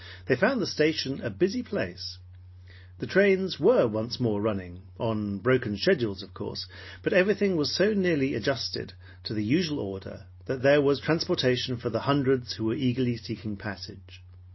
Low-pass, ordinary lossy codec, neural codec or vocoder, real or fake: 7.2 kHz; MP3, 24 kbps; none; real